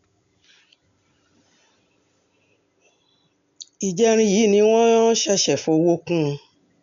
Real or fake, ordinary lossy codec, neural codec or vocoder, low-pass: real; none; none; 7.2 kHz